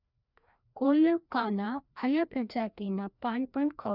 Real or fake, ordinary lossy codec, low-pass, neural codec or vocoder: fake; none; 5.4 kHz; codec, 16 kHz, 1 kbps, FreqCodec, larger model